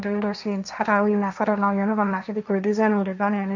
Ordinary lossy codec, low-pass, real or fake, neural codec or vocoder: none; 7.2 kHz; fake; codec, 16 kHz, 1.1 kbps, Voila-Tokenizer